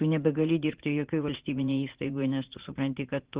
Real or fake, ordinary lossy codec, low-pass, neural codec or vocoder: fake; Opus, 16 kbps; 3.6 kHz; vocoder, 44.1 kHz, 80 mel bands, Vocos